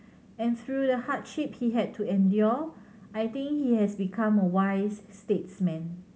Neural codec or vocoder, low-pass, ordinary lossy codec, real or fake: none; none; none; real